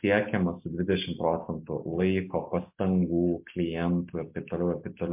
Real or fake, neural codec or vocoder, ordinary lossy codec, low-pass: real; none; MP3, 32 kbps; 3.6 kHz